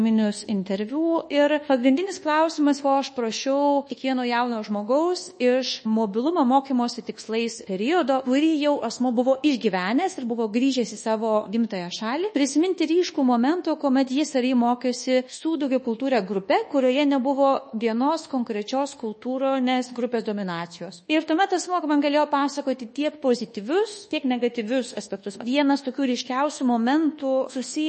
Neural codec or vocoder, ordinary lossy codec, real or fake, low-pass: codec, 24 kHz, 1.2 kbps, DualCodec; MP3, 32 kbps; fake; 10.8 kHz